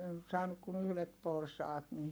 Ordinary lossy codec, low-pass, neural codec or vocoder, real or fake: none; none; codec, 44.1 kHz, 7.8 kbps, Pupu-Codec; fake